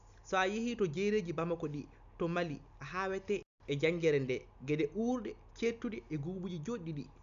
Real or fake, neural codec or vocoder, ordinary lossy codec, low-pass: real; none; AAC, 96 kbps; 7.2 kHz